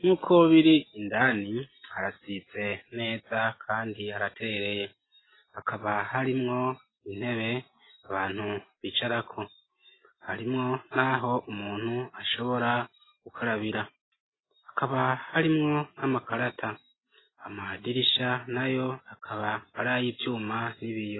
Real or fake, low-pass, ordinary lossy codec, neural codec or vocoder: real; 7.2 kHz; AAC, 16 kbps; none